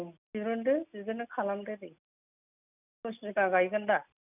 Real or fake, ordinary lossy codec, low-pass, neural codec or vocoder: real; none; 3.6 kHz; none